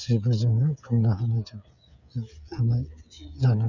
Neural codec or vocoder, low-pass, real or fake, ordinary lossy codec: codec, 16 kHz, 8 kbps, FreqCodec, smaller model; 7.2 kHz; fake; none